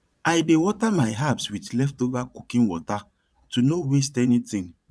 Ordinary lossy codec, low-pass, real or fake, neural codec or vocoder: none; none; fake; vocoder, 22.05 kHz, 80 mel bands, Vocos